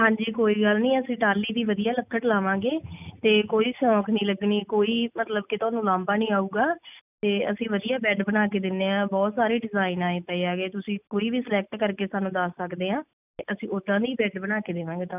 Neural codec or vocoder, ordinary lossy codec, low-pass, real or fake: none; none; 3.6 kHz; real